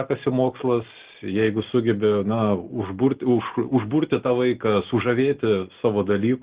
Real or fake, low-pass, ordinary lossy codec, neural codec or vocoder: real; 3.6 kHz; Opus, 16 kbps; none